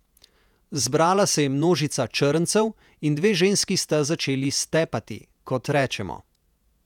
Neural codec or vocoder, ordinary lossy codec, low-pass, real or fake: vocoder, 48 kHz, 128 mel bands, Vocos; none; 19.8 kHz; fake